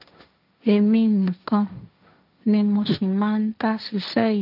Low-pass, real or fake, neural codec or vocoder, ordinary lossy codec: 5.4 kHz; fake; codec, 16 kHz, 1.1 kbps, Voila-Tokenizer; none